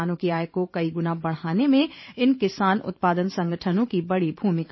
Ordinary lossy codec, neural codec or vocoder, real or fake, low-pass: MP3, 24 kbps; vocoder, 44.1 kHz, 128 mel bands every 512 samples, BigVGAN v2; fake; 7.2 kHz